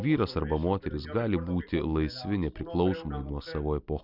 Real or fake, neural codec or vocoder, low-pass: real; none; 5.4 kHz